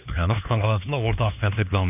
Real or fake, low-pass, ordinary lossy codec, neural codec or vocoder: fake; 3.6 kHz; none; codec, 16 kHz, 2 kbps, X-Codec, HuBERT features, trained on LibriSpeech